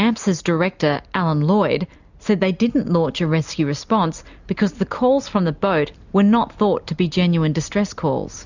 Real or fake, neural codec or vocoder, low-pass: real; none; 7.2 kHz